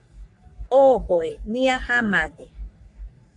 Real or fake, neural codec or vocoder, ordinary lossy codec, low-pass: fake; codec, 44.1 kHz, 3.4 kbps, Pupu-Codec; AAC, 64 kbps; 10.8 kHz